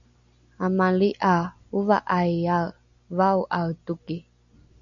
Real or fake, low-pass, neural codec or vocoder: real; 7.2 kHz; none